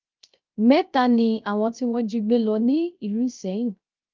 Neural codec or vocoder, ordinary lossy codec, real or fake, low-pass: codec, 16 kHz, 0.3 kbps, FocalCodec; Opus, 24 kbps; fake; 7.2 kHz